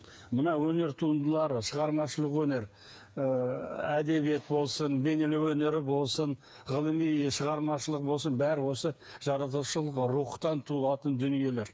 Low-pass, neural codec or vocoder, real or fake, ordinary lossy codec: none; codec, 16 kHz, 4 kbps, FreqCodec, smaller model; fake; none